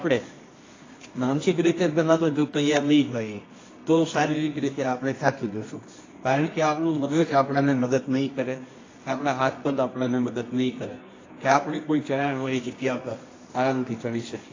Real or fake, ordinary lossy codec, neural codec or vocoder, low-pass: fake; AAC, 32 kbps; codec, 24 kHz, 0.9 kbps, WavTokenizer, medium music audio release; 7.2 kHz